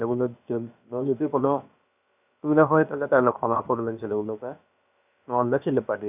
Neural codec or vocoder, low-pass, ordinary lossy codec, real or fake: codec, 16 kHz, about 1 kbps, DyCAST, with the encoder's durations; 3.6 kHz; none; fake